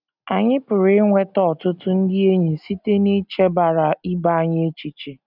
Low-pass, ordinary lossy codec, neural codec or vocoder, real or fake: 5.4 kHz; none; none; real